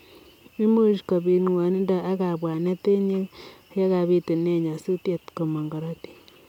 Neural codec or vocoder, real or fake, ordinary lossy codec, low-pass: none; real; none; 19.8 kHz